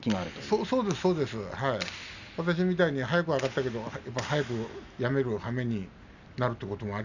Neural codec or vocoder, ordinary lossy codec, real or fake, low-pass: none; none; real; 7.2 kHz